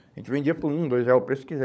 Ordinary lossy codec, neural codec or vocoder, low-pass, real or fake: none; codec, 16 kHz, 16 kbps, FunCodec, trained on LibriTTS, 50 frames a second; none; fake